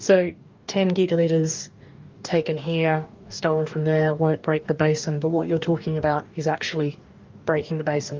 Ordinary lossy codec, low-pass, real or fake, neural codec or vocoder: Opus, 24 kbps; 7.2 kHz; fake; codec, 44.1 kHz, 2.6 kbps, DAC